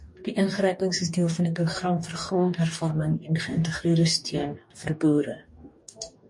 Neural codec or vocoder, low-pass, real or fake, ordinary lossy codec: codec, 44.1 kHz, 2.6 kbps, DAC; 10.8 kHz; fake; MP3, 48 kbps